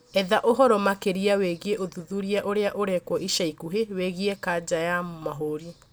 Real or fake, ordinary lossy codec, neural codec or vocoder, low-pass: real; none; none; none